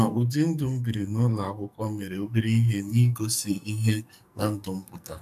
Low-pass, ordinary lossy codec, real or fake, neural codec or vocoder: 14.4 kHz; none; fake; codec, 44.1 kHz, 2.6 kbps, SNAC